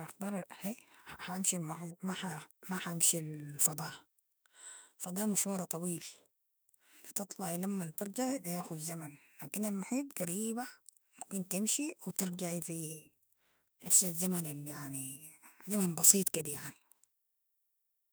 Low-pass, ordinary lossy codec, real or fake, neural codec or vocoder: none; none; fake; autoencoder, 48 kHz, 32 numbers a frame, DAC-VAE, trained on Japanese speech